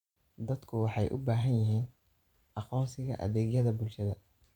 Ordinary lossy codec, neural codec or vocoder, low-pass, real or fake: none; vocoder, 44.1 kHz, 128 mel bands every 512 samples, BigVGAN v2; 19.8 kHz; fake